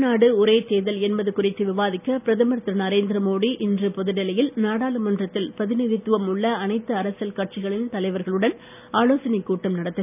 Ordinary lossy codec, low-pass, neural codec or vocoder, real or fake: none; 3.6 kHz; none; real